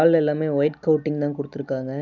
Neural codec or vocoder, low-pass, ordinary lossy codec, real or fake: none; 7.2 kHz; none; real